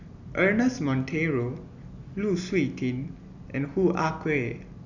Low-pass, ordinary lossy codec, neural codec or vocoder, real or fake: 7.2 kHz; none; none; real